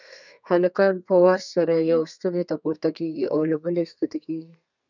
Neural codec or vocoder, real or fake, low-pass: codec, 32 kHz, 1.9 kbps, SNAC; fake; 7.2 kHz